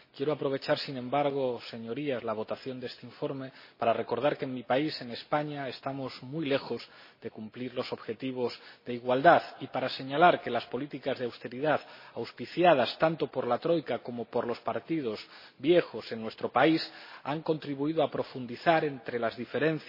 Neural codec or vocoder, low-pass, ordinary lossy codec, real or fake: none; 5.4 kHz; MP3, 24 kbps; real